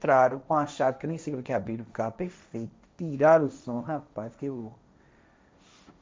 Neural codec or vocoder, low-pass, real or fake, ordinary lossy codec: codec, 16 kHz, 1.1 kbps, Voila-Tokenizer; none; fake; none